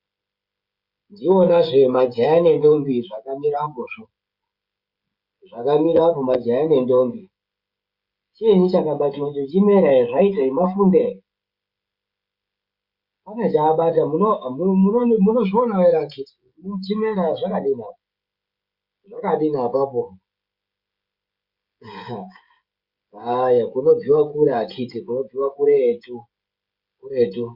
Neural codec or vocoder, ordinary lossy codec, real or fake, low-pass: codec, 16 kHz, 16 kbps, FreqCodec, smaller model; Opus, 64 kbps; fake; 5.4 kHz